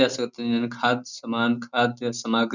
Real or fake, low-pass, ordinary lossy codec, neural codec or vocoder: real; 7.2 kHz; none; none